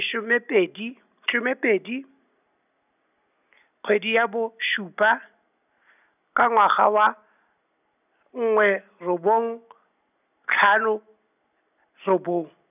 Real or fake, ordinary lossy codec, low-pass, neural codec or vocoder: real; none; 3.6 kHz; none